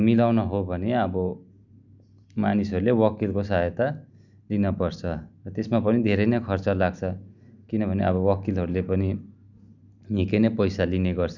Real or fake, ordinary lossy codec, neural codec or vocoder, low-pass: real; none; none; 7.2 kHz